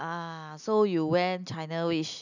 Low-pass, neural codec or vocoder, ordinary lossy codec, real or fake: 7.2 kHz; none; none; real